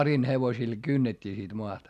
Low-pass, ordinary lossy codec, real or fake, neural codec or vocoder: 14.4 kHz; none; real; none